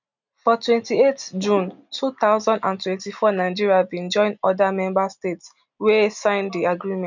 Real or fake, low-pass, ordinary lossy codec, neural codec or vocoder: real; 7.2 kHz; none; none